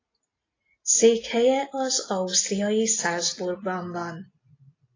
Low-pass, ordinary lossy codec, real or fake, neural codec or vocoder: 7.2 kHz; AAC, 32 kbps; real; none